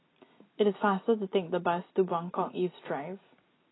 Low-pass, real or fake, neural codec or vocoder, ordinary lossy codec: 7.2 kHz; real; none; AAC, 16 kbps